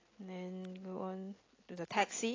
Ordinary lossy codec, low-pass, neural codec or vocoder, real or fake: AAC, 32 kbps; 7.2 kHz; none; real